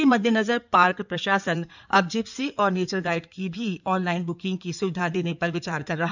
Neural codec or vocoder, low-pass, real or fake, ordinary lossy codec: codec, 16 kHz in and 24 kHz out, 2.2 kbps, FireRedTTS-2 codec; 7.2 kHz; fake; none